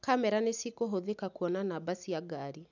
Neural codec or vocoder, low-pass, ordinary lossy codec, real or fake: none; 7.2 kHz; none; real